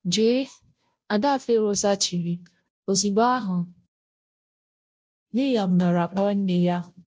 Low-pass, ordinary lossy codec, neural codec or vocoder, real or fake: none; none; codec, 16 kHz, 0.5 kbps, FunCodec, trained on Chinese and English, 25 frames a second; fake